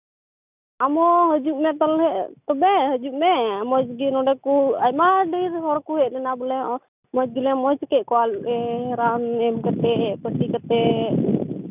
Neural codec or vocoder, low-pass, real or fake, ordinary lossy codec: none; 3.6 kHz; real; none